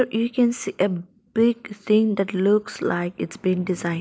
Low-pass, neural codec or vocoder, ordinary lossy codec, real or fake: none; none; none; real